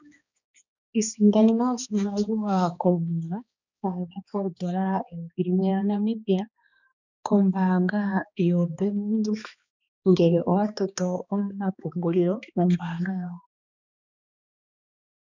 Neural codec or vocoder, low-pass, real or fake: codec, 16 kHz, 2 kbps, X-Codec, HuBERT features, trained on balanced general audio; 7.2 kHz; fake